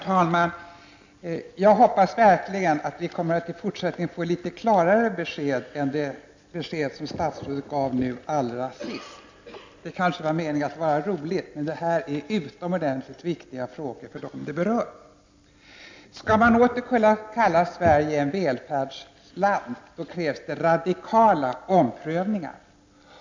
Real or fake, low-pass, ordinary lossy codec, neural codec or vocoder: real; 7.2 kHz; MP3, 64 kbps; none